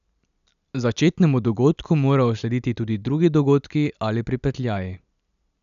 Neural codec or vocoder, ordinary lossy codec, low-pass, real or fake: none; none; 7.2 kHz; real